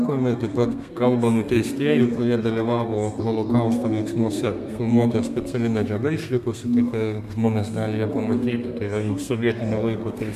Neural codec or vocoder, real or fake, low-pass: codec, 32 kHz, 1.9 kbps, SNAC; fake; 14.4 kHz